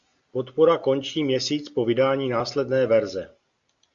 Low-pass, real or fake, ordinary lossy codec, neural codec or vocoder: 7.2 kHz; real; Opus, 64 kbps; none